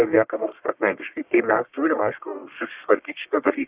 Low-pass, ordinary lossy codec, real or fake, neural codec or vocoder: 3.6 kHz; Opus, 64 kbps; fake; codec, 44.1 kHz, 1.7 kbps, Pupu-Codec